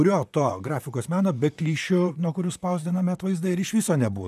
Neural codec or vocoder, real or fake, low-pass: vocoder, 44.1 kHz, 128 mel bands, Pupu-Vocoder; fake; 14.4 kHz